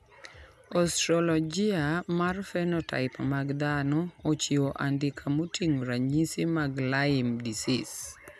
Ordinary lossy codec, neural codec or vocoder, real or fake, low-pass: none; none; real; 14.4 kHz